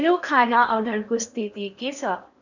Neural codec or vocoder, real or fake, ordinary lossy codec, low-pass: codec, 16 kHz in and 24 kHz out, 0.8 kbps, FocalCodec, streaming, 65536 codes; fake; none; 7.2 kHz